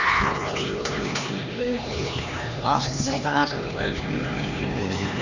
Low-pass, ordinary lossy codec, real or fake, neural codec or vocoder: 7.2 kHz; Opus, 64 kbps; fake; codec, 16 kHz, 2 kbps, X-Codec, HuBERT features, trained on LibriSpeech